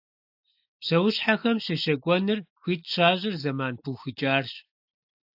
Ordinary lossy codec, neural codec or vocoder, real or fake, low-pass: AAC, 48 kbps; none; real; 5.4 kHz